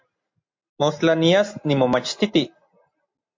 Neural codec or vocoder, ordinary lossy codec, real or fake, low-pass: none; MP3, 48 kbps; real; 7.2 kHz